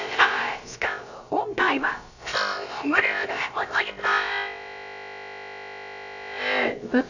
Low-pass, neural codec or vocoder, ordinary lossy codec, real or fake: 7.2 kHz; codec, 16 kHz, about 1 kbps, DyCAST, with the encoder's durations; none; fake